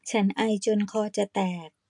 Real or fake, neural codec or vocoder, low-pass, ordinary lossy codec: real; none; 10.8 kHz; MP3, 64 kbps